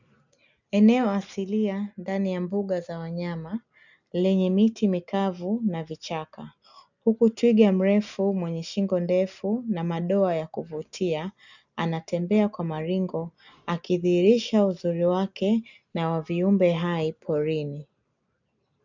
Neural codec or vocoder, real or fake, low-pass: none; real; 7.2 kHz